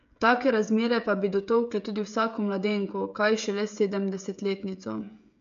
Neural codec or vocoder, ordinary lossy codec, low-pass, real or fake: codec, 16 kHz, 16 kbps, FreqCodec, smaller model; MP3, 64 kbps; 7.2 kHz; fake